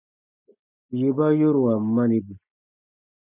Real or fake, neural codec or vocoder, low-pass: real; none; 3.6 kHz